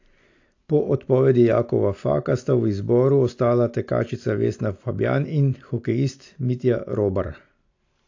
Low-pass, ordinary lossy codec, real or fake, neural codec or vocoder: 7.2 kHz; AAC, 48 kbps; real; none